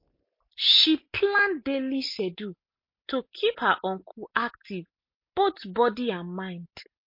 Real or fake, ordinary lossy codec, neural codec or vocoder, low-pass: real; MP3, 32 kbps; none; 5.4 kHz